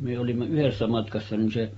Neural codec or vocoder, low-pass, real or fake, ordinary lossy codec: none; 19.8 kHz; real; AAC, 24 kbps